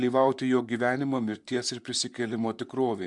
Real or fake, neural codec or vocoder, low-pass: fake; vocoder, 44.1 kHz, 128 mel bands, Pupu-Vocoder; 10.8 kHz